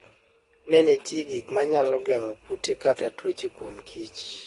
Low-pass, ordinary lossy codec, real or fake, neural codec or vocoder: 10.8 kHz; AAC, 32 kbps; fake; codec, 24 kHz, 3 kbps, HILCodec